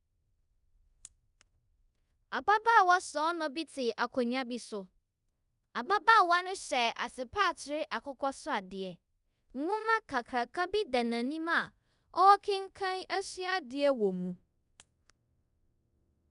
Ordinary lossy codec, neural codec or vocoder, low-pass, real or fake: none; codec, 24 kHz, 0.5 kbps, DualCodec; 10.8 kHz; fake